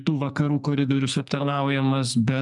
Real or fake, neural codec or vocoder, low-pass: fake; codec, 44.1 kHz, 2.6 kbps, SNAC; 10.8 kHz